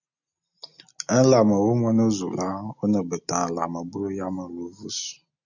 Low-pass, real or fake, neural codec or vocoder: 7.2 kHz; real; none